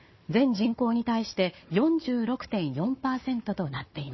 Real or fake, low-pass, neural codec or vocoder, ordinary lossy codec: fake; 7.2 kHz; codec, 16 kHz, 4 kbps, FunCodec, trained on Chinese and English, 50 frames a second; MP3, 24 kbps